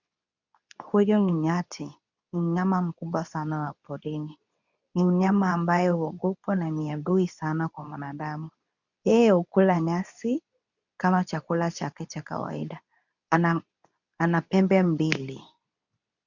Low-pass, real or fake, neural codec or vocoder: 7.2 kHz; fake; codec, 24 kHz, 0.9 kbps, WavTokenizer, medium speech release version 2